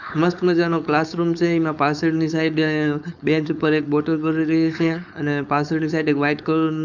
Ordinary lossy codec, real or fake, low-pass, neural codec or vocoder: none; fake; 7.2 kHz; codec, 16 kHz, 4.8 kbps, FACodec